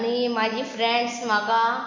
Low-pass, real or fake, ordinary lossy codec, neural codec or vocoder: 7.2 kHz; real; MP3, 48 kbps; none